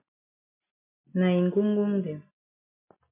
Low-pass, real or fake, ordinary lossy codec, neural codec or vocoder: 3.6 kHz; real; MP3, 24 kbps; none